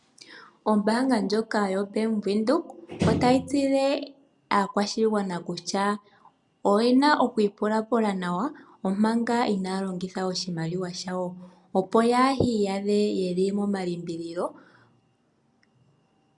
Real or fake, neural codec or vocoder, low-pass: real; none; 10.8 kHz